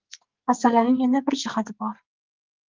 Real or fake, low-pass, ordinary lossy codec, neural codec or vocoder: fake; 7.2 kHz; Opus, 24 kbps; codec, 44.1 kHz, 2.6 kbps, SNAC